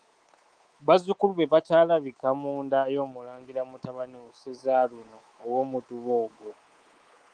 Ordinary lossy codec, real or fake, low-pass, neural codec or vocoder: Opus, 24 kbps; fake; 9.9 kHz; codec, 24 kHz, 3.1 kbps, DualCodec